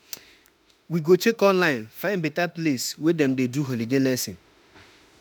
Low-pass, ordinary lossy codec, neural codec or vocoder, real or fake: none; none; autoencoder, 48 kHz, 32 numbers a frame, DAC-VAE, trained on Japanese speech; fake